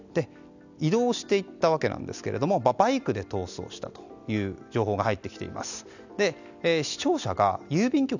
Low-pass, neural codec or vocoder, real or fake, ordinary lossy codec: 7.2 kHz; none; real; none